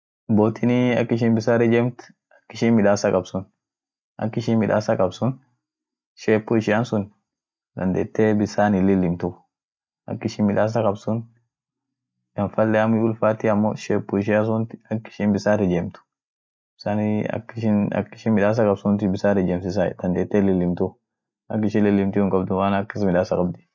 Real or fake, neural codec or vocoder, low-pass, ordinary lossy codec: real; none; none; none